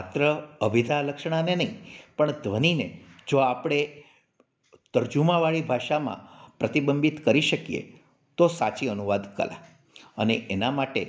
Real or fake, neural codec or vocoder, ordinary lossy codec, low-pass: real; none; none; none